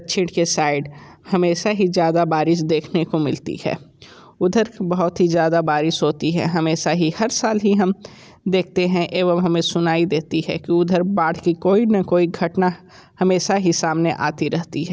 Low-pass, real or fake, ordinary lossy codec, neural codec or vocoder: none; real; none; none